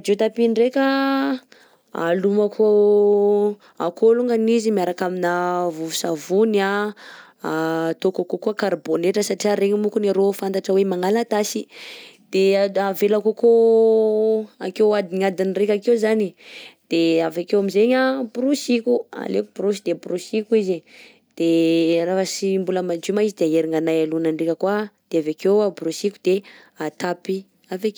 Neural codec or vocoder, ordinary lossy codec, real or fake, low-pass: none; none; real; none